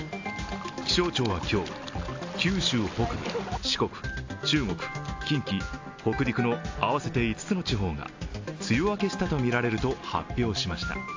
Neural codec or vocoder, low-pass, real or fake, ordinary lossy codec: none; 7.2 kHz; real; AAC, 48 kbps